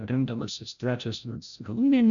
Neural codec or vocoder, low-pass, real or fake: codec, 16 kHz, 0.5 kbps, FreqCodec, larger model; 7.2 kHz; fake